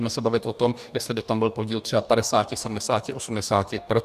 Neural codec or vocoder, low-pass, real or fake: codec, 44.1 kHz, 2.6 kbps, DAC; 14.4 kHz; fake